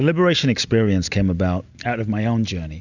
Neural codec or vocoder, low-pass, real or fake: none; 7.2 kHz; real